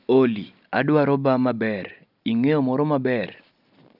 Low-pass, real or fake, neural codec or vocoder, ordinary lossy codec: 5.4 kHz; real; none; none